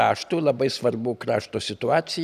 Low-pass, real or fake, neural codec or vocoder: 14.4 kHz; real; none